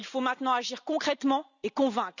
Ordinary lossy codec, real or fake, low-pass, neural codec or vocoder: none; real; 7.2 kHz; none